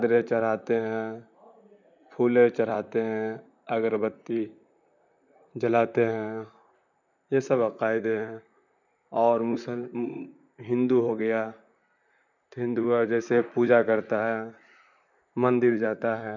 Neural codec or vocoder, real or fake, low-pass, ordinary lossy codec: vocoder, 44.1 kHz, 128 mel bands, Pupu-Vocoder; fake; 7.2 kHz; none